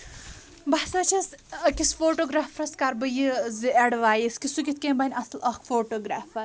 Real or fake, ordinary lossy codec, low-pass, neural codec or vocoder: real; none; none; none